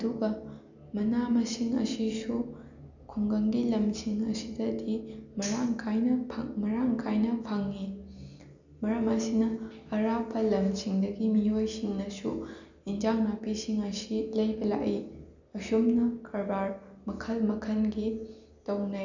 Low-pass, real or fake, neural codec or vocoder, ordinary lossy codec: 7.2 kHz; real; none; none